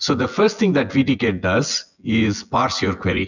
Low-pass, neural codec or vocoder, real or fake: 7.2 kHz; vocoder, 24 kHz, 100 mel bands, Vocos; fake